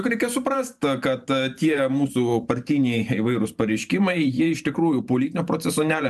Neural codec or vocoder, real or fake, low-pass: vocoder, 44.1 kHz, 128 mel bands every 512 samples, BigVGAN v2; fake; 14.4 kHz